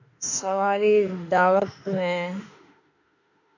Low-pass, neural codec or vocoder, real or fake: 7.2 kHz; autoencoder, 48 kHz, 32 numbers a frame, DAC-VAE, trained on Japanese speech; fake